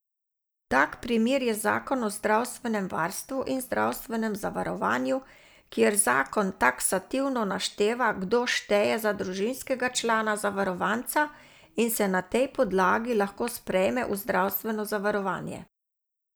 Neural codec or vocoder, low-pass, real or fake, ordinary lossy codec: none; none; real; none